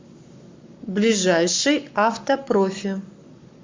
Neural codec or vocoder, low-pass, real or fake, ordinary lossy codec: codec, 44.1 kHz, 7.8 kbps, Pupu-Codec; 7.2 kHz; fake; MP3, 64 kbps